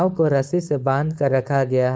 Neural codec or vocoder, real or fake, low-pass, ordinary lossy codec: codec, 16 kHz, 4.8 kbps, FACodec; fake; none; none